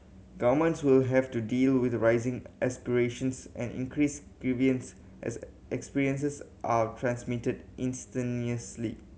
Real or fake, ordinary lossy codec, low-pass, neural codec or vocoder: real; none; none; none